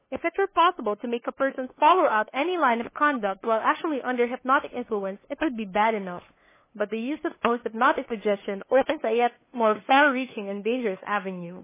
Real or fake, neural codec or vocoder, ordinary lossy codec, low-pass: fake; codec, 16 kHz, 2 kbps, FunCodec, trained on LibriTTS, 25 frames a second; MP3, 16 kbps; 3.6 kHz